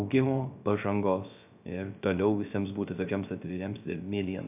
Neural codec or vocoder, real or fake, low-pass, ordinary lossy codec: codec, 16 kHz, 0.3 kbps, FocalCodec; fake; 3.6 kHz; AAC, 32 kbps